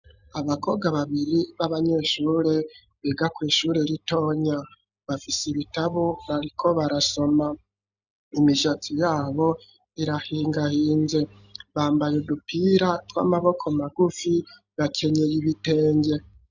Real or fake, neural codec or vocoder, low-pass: real; none; 7.2 kHz